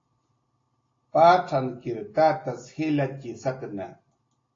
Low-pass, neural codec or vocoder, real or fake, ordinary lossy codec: 7.2 kHz; none; real; AAC, 32 kbps